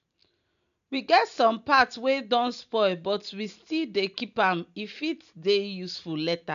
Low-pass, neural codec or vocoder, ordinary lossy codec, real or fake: 7.2 kHz; none; none; real